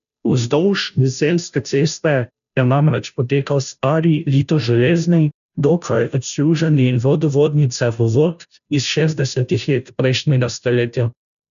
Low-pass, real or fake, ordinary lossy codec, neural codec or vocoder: 7.2 kHz; fake; none; codec, 16 kHz, 0.5 kbps, FunCodec, trained on Chinese and English, 25 frames a second